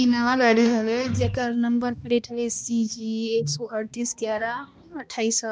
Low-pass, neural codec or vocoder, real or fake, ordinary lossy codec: none; codec, 16 kHz, 1 kbps, X-Codec, HuBERT features, trained on balanced general audio; fake; none